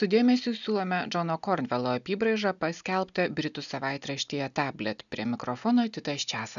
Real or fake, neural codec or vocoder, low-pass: real; none; 7.2 kHz